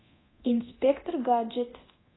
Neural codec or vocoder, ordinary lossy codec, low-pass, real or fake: codec, 24 kHz, 0.9 kbps, DualCodec; AAC, 16 kbps; 7.2 kHz; fake